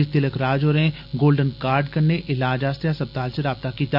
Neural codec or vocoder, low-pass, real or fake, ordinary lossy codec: none; 5.4 kHz; real; none